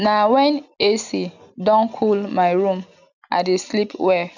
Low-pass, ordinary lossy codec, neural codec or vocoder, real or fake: 7.2 kHz; none; none; real